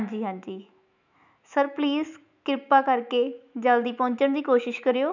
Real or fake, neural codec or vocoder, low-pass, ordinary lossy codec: fake; autoencoder, 48 kHz, 128 numbers a frame, DAC-VAE, trained on Japanese speech; 7.2 kHz; none